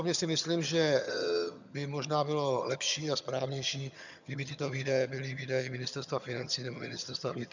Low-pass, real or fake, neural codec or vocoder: 7.2 kHz; fake; vocoder, 22.05 kHz, 80 mel bands, HiFi-GAN